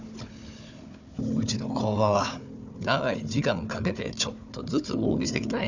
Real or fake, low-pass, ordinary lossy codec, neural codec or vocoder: fake; 7.2 kHz; none; codec, 16 kHz, 4 kbps, FunCodec, trained on Chinese and English, 50 frames a second